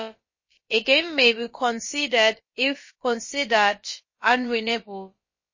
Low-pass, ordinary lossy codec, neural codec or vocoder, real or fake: 7.2 kHz; MP3, 32 kbps; codec, 16 kHz, about 1 kbps, DyCAST, with the encoder's durations; fake